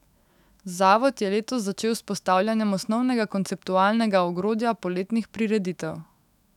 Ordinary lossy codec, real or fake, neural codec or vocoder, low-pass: none; fake; autoencoder, 48 kHz, 128 numbers a frame, DAC-VAE, trained on Japanese speech; 19.8 kHz